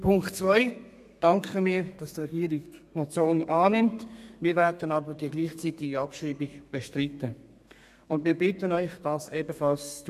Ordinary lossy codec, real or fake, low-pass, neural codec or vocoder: none; fake; 14.4 kHz; codec, 32 kHz, 1.9 kbps, SNAC